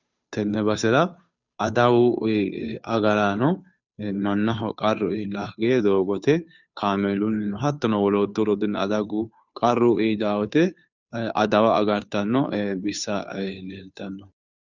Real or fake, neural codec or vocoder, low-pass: fake; codec, 16 kHz, 2 kbps, FunCodec, trained on Chinese and English, 25 frames a second; 7.2 kHz